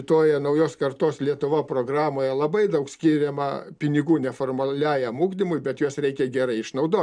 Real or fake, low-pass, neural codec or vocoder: real; 9.9 kHz; none